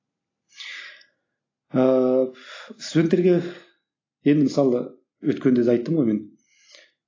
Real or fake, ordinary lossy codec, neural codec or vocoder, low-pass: real; AAC, 32 kbps; none; 7.2 kHz